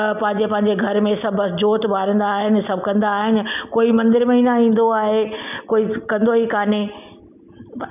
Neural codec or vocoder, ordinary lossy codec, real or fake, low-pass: none; none; real; 3.6 kHz